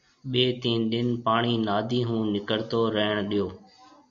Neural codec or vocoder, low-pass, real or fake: none; 7.2 kHz; real